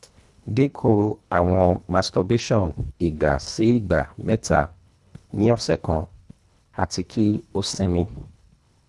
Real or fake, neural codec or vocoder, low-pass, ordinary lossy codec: fake; codec, 24 kHz, 1.5 kbps, HILCodec; none; none